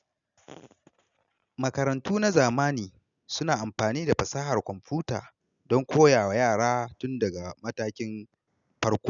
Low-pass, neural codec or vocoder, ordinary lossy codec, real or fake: 7.2 kHz; none; none; real